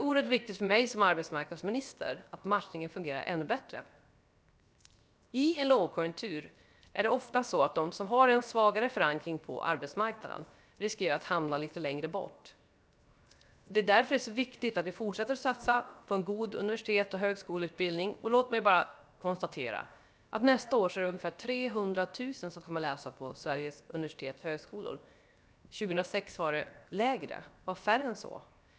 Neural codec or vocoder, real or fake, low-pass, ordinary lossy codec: codec, 16 kHz, 0.7 kbps, FocalCodec; fake; none; none